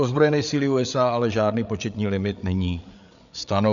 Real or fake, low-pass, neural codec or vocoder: fake; 7.2 kHz; codec, 16 kHz, 8 kbps, FreqCodec, larger model